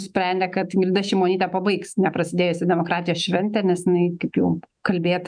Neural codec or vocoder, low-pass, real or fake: autoencoder, 48 kHz, 128 numbers a frame, DAC-VAE, trained on Japanese speech; 9.9 kHz; fake